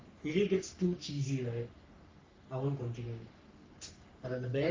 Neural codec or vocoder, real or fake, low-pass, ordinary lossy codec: codec, 44.1 kHz, 3.4 kbps, Pupu-Codec; fake; 7.2 kHz; Opus, 32 kbps